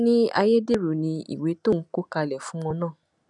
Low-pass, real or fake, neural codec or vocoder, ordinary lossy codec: 10.8 kHz; real; none; none